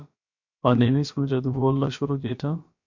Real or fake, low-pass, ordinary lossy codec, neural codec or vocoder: fake; 7.2 kHz; MP3, 48 kbps; codec, 16 kHz, about 1 kbps, DyCAST, with the encoder's durations